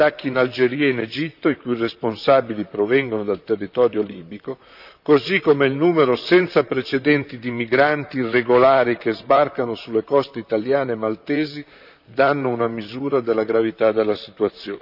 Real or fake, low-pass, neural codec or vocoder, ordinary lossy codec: fake; 5.4 kHz; vocoder, 44.1 kHz, 128 mel bands, Pupu-Vocoder; none